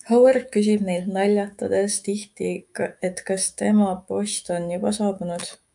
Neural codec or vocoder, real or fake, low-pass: autoencoder, 48 kHz, 128 numbers a frame, DAC-VAE, trained on Japanese speech; fake; 10.8 kHz